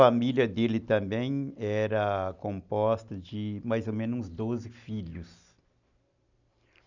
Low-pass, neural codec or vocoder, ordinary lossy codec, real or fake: 7.2 kHz; none; none; real